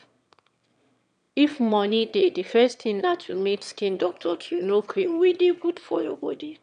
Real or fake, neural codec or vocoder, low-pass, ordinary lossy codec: fake; autoencoder, 22.05 kHz, a latent of 192 numbers a frame, VITS, trained on one speaker; 9.9 kHz; MP3, 96 kbps